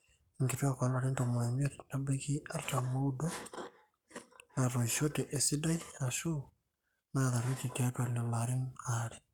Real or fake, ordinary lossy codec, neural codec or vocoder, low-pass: fake; none; codec, 44.1 kHz, 7.8 kbps, Pupu-Codec; 19.8 kHz